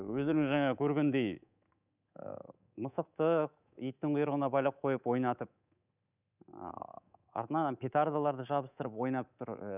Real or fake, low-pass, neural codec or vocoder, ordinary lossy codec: fake; 3.6 kHz; codec, 24 kHz, 3.1 kbps, DualCodec; none